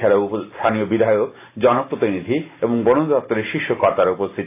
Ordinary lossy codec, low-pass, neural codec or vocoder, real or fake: AAC, 24 kbps; 3.6 kHz; none; real